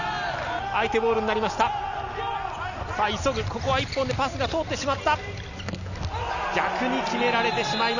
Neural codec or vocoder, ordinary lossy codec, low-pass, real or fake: none; none; 7.2 kHz; real